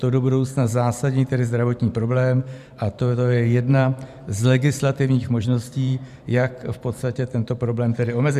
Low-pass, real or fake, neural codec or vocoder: 14.4 kHz; fake; vocoder, 44.1 kHz, 128 mel bands every 512 samples, BigVGAN v2